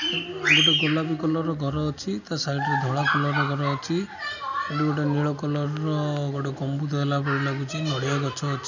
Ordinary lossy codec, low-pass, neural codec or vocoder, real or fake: none; 7.2 kHz; none; real